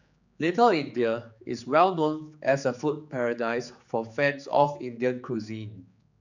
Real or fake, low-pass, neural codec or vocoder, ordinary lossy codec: fake; 7.2 kHz; codec, 16 kHz, 4 kbps, X-Codec, HuBERT features, trained on general audio; none